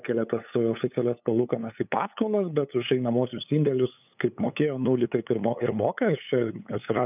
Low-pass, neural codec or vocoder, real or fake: 3.6 kHz; codec, 16 kHz, 8 kbps, FunCodec, trained on LibriTTS, 25 frames a second; fake